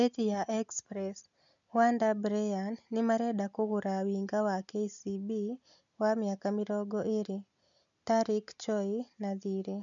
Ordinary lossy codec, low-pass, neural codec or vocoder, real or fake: none; 7.2 kHz; none; real